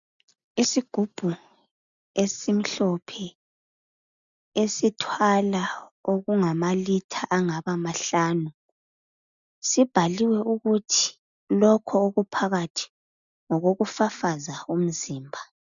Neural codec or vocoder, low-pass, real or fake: none; 7.2 kHz; real